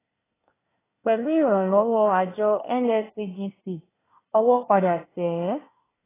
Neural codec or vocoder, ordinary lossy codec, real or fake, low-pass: codec, 24 kHz, 1 kbps, SNAC; AAC, 16 kbps; fake; 3.6 kHz